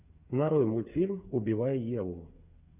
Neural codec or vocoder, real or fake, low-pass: codec, 16 kHz, 8 kbps, FreqCodec, smaller model; fake; 3.6 kHz